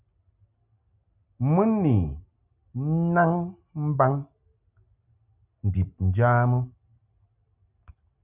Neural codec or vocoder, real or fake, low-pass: none; real; 3.6 kHz